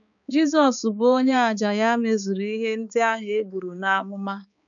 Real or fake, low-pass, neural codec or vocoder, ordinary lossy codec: fake; 7.2 kHz; codec, 16 kHz, 4 kbps, X-Codec, HuBERT features, trained on balanced general audio; none